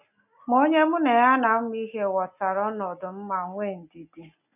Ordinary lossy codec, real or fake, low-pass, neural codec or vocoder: none; real; 3.6 kHz; none